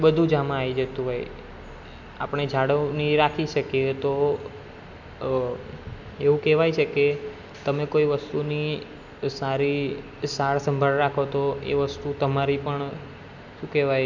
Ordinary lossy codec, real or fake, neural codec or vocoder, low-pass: none; real; none; 7.2 kHz